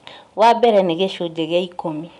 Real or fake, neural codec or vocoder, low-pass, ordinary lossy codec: real; none; 10.8 kHz; none